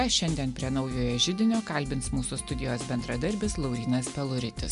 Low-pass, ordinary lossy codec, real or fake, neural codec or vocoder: 10.8 kHz; MP3, 64 kbps; real; none